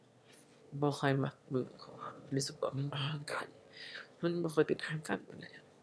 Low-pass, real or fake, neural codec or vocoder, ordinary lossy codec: none; fake; autoencoder, 22.05 kHz, a latent of 192 numbers a frame, VITS, trained on one speaker; none